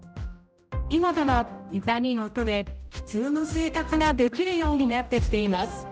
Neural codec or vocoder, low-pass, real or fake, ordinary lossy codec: codec, 16 kHz, 0.5 kbps, X-Codec, HuBERT features, trained on general audio; none; fake; none